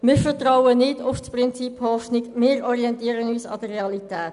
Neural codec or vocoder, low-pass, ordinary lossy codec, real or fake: none; 10.8 kHz; none; real